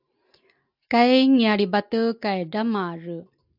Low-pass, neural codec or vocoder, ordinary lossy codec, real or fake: 5.4 kHz; none; AAC, 48 kbps; real